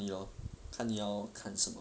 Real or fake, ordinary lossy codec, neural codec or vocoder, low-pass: real; none; none; none